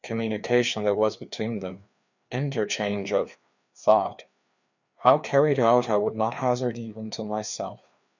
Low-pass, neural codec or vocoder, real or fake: 7.2 kHz; codec, 16 kHz, 2 kbps, FreqCodec, larger model; fake